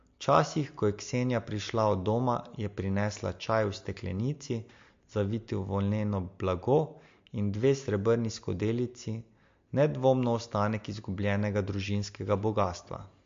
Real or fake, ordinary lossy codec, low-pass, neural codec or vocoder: real; MP3, 48 kbps; 7.2 kHz; none